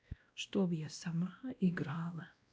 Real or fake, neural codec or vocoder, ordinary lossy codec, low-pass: fake; codec, 16 kHz, 1 kbps, X-Codec, WavLM features, trained on Multilingual LibriSpeech; none; none